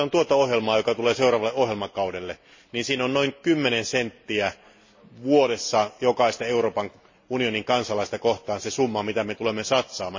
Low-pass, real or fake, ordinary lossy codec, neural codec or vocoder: 7.2 kHz; real; MP3, 32 kbps; none